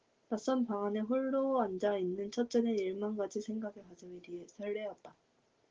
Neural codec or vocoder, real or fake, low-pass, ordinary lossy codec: none; real; 7.2 kHz; Opus, 16 kbps